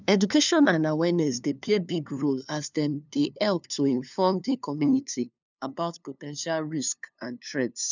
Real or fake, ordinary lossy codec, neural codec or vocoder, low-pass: fake; none; codec, 16 kHz, 2 kbps, FunCodec, trained on LibriTTS, 25 frames a second; 7.2 kHz